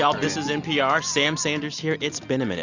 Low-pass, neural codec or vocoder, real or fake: 7.2 kHz; none; real